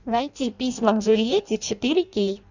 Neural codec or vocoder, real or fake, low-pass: codec, 16 kHz in and 24 kHz out, 0.6 kbps, FireRedTTS-2 codec; fake; 7.2 kHz